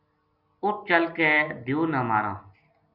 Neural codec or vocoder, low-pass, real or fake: none; 5.4 kHz; real